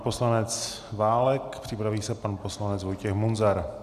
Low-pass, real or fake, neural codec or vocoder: 14.4 kHz; real; none